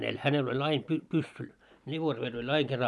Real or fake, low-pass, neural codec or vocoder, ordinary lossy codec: real; none; none; none